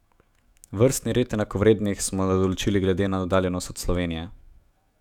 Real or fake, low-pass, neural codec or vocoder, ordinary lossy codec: fake; 19.8 kHz; autoencoder, 48 kHz, 128 numbers a frame, DAC-VAE, trained on Japanese speech; none